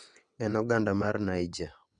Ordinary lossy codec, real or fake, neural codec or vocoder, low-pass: none; fake; vocoder, 22.05 kHz, 80 mel bands, WaveNeXt; 9.9 kHz